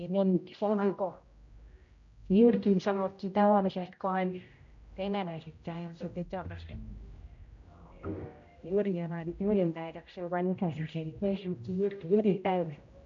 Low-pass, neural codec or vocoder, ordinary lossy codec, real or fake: 7.2 kHz; codec, 16 kHz, 0.5 kbps, X-Codec, HuBERT features, trained on general audio; MP3, 48 kbps; fake